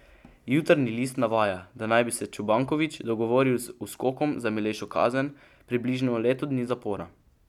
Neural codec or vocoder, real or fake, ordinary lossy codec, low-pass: none; real; none; 19.8 kHz